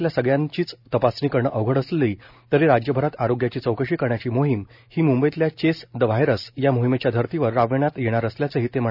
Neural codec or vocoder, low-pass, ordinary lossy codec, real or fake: none; 5.4 kHz; none; real